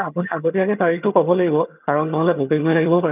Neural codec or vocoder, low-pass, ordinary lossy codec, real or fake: vocoder, 22.05 kHz, 80 mel bands, HiFi-GAN; 3.6 kHz; none; fake